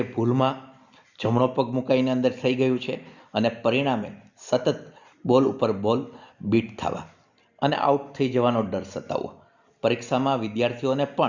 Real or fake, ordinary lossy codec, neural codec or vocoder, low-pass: real; Opus, 64 kbps; none; 7.2 kHz